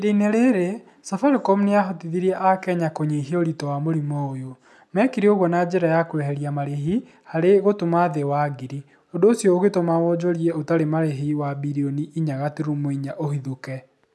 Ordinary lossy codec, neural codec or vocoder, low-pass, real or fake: none; none; none; real